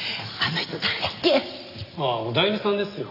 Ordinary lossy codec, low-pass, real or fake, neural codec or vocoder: none; 5.4 kHz; real; none